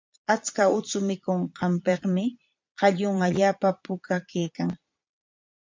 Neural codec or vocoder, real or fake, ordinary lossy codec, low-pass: vocoder, 22.05 kHz, 80 mel bands, Vocos; fake; MP3, 64 kbps; 7.2 kHz